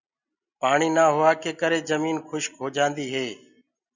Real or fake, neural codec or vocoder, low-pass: real; none; 7.2 kHz